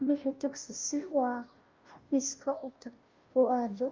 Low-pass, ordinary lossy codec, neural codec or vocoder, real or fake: 7.2 kHz; Opus, 24 kbps; codec, 16 kHz, 0.5 kbps, FunCodec, trained on Chinese and English, 25 frames a second; fake